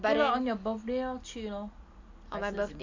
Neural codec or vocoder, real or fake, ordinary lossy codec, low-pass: none; real; AAC, 48 kbps; 7.2 kHz